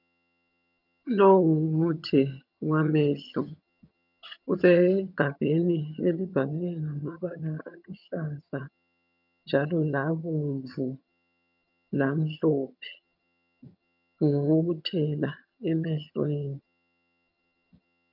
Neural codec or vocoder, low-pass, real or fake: vocoder, 22.05 kHz, 80 mel bands, HiFi-GAN; 5.4 kHz; fake